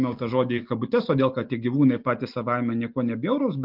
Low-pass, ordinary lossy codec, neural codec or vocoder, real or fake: 5.4 kHz; Opus, 32 kbps; none; real